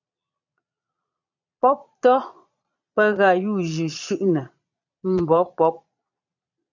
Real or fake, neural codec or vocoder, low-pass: fake; vocoder, 22.05 kHz, 80 mel bands, Vocos; 7.2 kHz